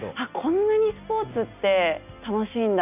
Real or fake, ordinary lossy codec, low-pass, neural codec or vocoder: real; none; 3.6 kHz; none